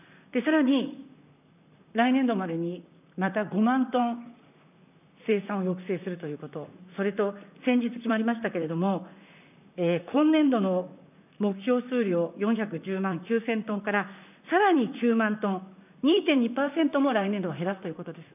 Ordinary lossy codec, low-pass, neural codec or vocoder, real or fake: none; 3.6 kHz; vocoder, 44.1 kHz, 128 mel bands, Pupu-Vocoder; fake